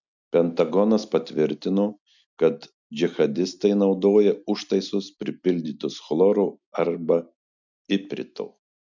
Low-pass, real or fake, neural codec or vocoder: 7.2 kHz; real; none